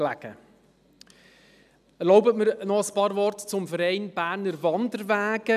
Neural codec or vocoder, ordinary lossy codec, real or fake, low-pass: none; none; real; 14.4 kHz